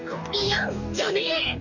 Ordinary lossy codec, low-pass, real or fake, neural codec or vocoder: none; 7.2 kHz; fake; codec, 44.1 kHz, 2.6 kbps, DAC